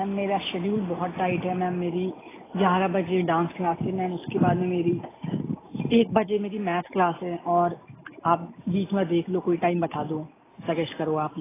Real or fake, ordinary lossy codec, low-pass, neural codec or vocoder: real; AAC, 16 kbps; 3.6 kHz; none